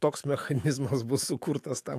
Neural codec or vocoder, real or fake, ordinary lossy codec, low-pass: autoencoder, 48 kHz, 128 numbers a frame, DAC-VAE, trained on Japanese speech; fake; AAC, 64 kbps; 14.4 kHz